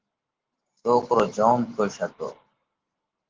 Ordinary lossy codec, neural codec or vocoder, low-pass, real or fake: Opus, 16 kbps; vocoder, 44.1 kHz, 128 mel bands every 512 samples, BigVGAN v2; 7.2 kHz; fake